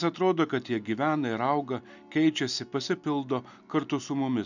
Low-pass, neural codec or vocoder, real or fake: 7.2 kHz; none; real